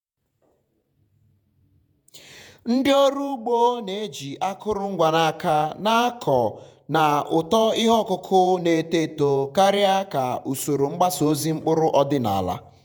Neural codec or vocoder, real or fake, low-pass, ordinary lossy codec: vocoder, 48 kHz, 128 mel bands, Vocos; fake; none; none